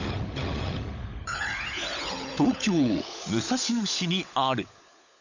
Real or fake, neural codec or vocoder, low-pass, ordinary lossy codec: fake; codec, 16 kHz, 16 kbps, FunCodec, trained on LibriTTS, 50 frames a second; 7.2 kHz; none